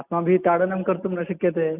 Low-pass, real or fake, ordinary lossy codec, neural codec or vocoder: 3.6 kHz; real; none; none